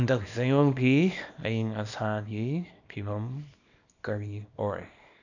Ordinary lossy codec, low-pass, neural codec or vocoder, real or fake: none; 7.2 kHz; codec, 24 kHz, 0.9 kbps, WavTokenizer, small release; fake